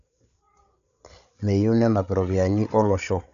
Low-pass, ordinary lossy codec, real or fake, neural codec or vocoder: 7.2 kHz; none; fake; codec, 16 kHz, 8 kbps, FreqCodec, larger model